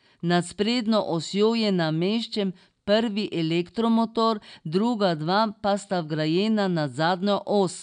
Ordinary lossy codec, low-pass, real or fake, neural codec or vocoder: none; 9.9 kHz; real; none